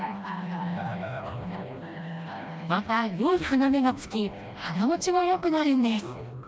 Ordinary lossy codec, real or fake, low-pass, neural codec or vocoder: none; fake; none; codec, 16 kHz, 1 kbps, FreqCodec, smaller model